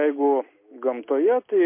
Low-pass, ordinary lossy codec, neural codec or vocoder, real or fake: 3.6 kHz; MP3, 24 kbps; none; real